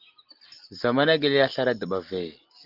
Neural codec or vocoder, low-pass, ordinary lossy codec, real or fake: none; 5.4 kHz; Opus, 32 kbps; real